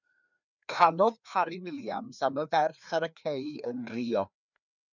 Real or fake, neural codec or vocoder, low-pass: fake; codec, 16 kHz, 2 kbps, FreqCodec, larger model; 7.2 kHz